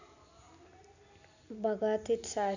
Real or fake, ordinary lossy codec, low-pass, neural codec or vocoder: real; none; 7.2 kHz; none